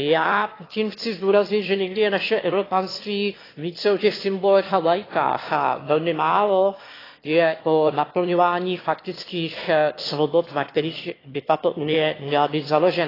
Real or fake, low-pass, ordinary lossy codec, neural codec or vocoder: fake; 5.4 kHz; AAC, 24 kbps; autoencoder, 22.05 kHz, a latent of 192 numbers a frame, VITS, trained on one speaker